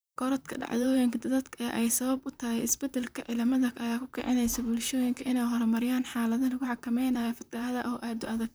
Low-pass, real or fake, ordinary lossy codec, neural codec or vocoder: none; fake; none; vocoder, 44.1 kHz, 128 mel bands, Pupu-Vocoder